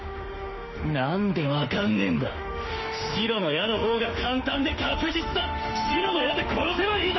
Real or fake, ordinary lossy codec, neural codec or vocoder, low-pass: fake; MP3, 24 kbps; autoencoder, 48 kHz, 32 numbers a frame, DAC-VAE, trained on Japanese speech; 7.2 kHz